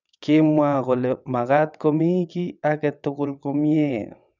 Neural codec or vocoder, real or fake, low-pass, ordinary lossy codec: vocoder, 22.05 kHz, 80 mel bands, WaveNeXt; fake; 7.2 kHz; none